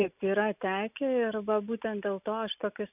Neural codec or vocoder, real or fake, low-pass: none; real; 3.6 kHz